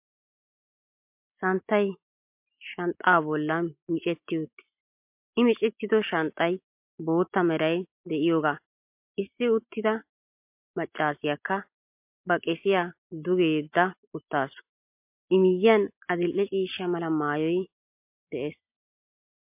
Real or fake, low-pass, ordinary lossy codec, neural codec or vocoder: real; 3.6 kHz; MP3, 32 kbps; none